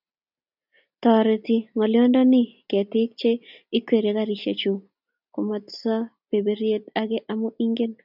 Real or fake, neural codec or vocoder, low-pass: real; none; 5.4 kHz